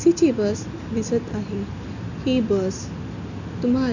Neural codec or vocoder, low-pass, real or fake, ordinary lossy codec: none; 7.2 kHz; real; none